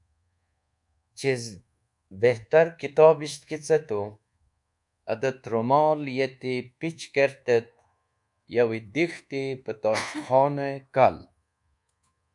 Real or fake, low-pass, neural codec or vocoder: fake; 10.8 kHz; codec, 24 kHz, 1.2 kbps, DualCodec